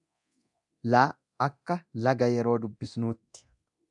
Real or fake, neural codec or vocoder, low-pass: fake; codec, 24 kHz, 0.9 kbps, DualCodec; 10.8 kHz